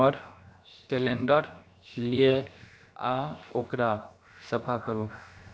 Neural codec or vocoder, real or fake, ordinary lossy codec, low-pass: codec, 16 kHz, 0.7 kbps, FocalCodec; fake; none; none